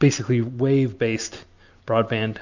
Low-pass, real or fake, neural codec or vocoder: 7.2 kHz; real; none